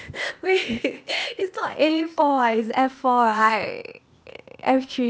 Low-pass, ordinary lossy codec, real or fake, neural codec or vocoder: none; none; fake; codec, 16 kHz, 0.8 kbps, ZipCodec